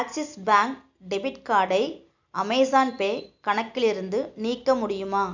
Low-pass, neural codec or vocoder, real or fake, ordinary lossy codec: 7.2 kHz; none; real; none